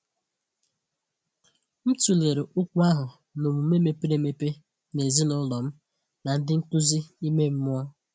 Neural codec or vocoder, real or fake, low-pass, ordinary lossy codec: none; real; none; none